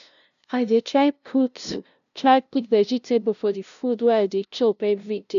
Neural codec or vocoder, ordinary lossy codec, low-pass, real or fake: codec, 16 kHz, 0.5 kbps, FunCodec, trained on LibriTTS, 25 frames a second; AAC, 96 kbps; 7.2 kHz; fake